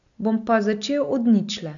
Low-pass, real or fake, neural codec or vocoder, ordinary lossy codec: 7.2 kHz; real; none; none